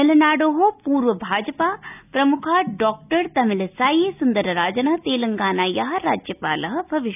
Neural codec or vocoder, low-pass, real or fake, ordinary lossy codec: none; 3.6 kHz; real; none